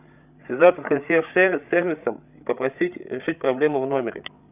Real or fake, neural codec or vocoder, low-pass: fake; codec, 16 kHz, 8 kbps, FreqCodec, larger model; 3.6 kHz